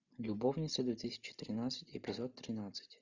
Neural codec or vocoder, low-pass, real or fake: none; 7.2 kHz; real